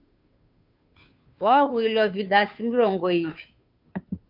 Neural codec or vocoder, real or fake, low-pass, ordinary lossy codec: codec, 16 kHz, 2 kbps, FunCodec, trained on Chinese and English, 25 frames a second; fake; 5.4 kHz; AAC, 48 kbps